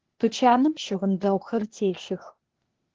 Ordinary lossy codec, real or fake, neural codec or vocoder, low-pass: Opus, 16 kbps; fake; codec, 16 kHz, 0.8 kbps, ZipCodec; 7.2 kHz